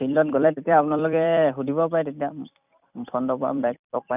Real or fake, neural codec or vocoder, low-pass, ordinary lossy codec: fake; vocoder, 44.1 kHz, 128 mel bands every 256 samples, BigVGAN v2; 3.6 kHz; none